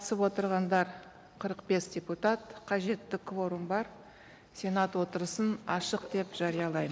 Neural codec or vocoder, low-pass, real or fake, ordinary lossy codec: none; none; real; none